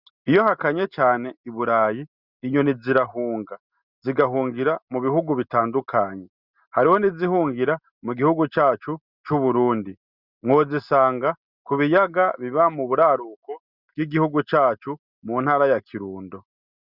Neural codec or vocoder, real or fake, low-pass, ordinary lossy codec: none; real; 5.4 kHz; Opus, 64 kbps